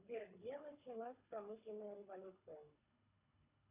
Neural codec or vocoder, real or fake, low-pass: codec, 24 kHz, 3 kbps, HILCodec; fake; 3.6 kHz